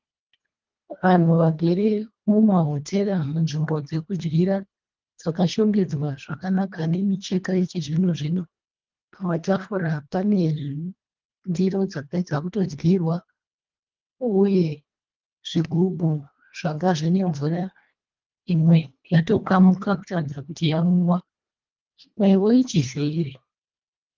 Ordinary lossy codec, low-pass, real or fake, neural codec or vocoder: Opus, 24 kbps; 7.2 kHz; fake; codec, 24 kHz, 1.5 kbps, HILCodec